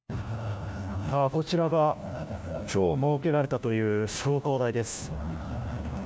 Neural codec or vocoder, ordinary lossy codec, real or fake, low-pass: codec, 16 kHz, 1 kbps, FunCodec, trained on LibriTTS, 50 frames a second; none; fake; none